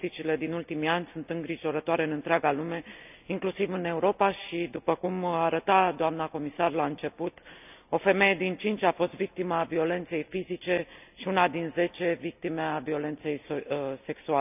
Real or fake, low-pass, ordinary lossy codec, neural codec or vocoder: real; 3.6 kHz; none; none